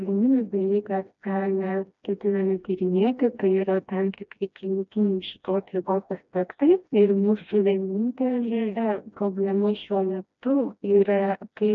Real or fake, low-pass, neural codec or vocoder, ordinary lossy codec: fake; 7.2 kHz; codec, 16 kHz, 1 kbps, FreqCodec, smaller model; MP3, 96 kbps